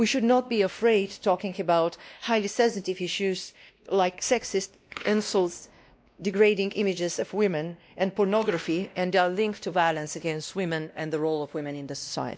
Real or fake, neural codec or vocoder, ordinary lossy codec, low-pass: fake; codec, 16 kHz, 1 kbps, X-Codec, WavLM features, trained on Multilingual LibriSpeech; none; none